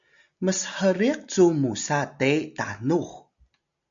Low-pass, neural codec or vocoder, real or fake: 7.2 kHz; none; real